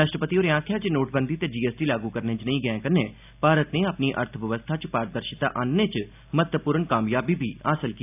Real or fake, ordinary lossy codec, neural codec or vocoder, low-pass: real; AAC, 32 kbps; none; 3.6 kHz